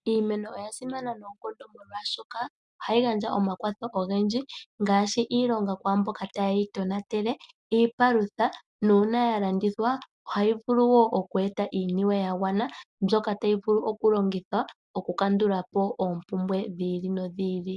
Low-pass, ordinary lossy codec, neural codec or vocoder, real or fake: 10.8 kHz; MP3, 96 kbps; none; real